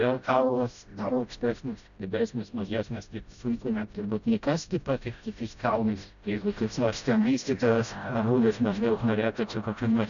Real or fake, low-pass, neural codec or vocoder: fake; 7.2 kHz; codec, 16 kHz, 0.5 kbps, FreqCodec, smaller model